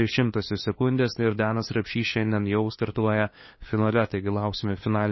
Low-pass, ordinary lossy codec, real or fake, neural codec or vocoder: 7.2 kHz; MP3, 24 kbps; fake; codec, 16 kHz, 2 kbps, FunCodec, trained on LibriTTS, 25 frames a second